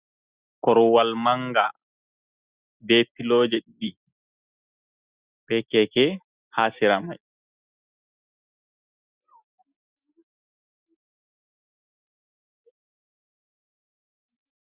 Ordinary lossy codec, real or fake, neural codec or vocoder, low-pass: Opus, 64 kbps; real; none; 3.6 kHz